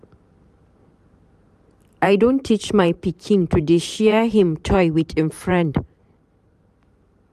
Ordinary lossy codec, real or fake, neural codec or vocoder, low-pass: none; fake; vocoder, 44.1 kHz, 128 mel bands every 512 samples, BigVGAN v2; 14.4 kHz